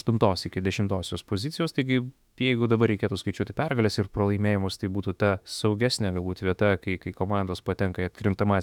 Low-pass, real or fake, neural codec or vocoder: 19.8 kHz; fake; autoencoder, 48 kHz, 32 numbers a frame, DAC-VAE, trained on Japanese speech